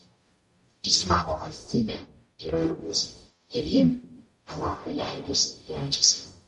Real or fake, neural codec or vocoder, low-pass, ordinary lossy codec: fake; codec, 44.1 kHz, 0.9 kbps, DAC; 14.4 kHz; MP3, 48 kbps